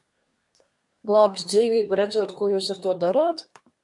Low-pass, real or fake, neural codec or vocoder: 10.8 kHz; fake; codec, 24 kHz, 1 kbps, SNAC